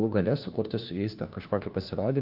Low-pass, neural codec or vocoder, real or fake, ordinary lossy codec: 5.4 kHz; codec, 16 kHz, 1 kbps, FunCodec, trained on LibriTTS, 50 frames a second; fake; Opus, 32 kbps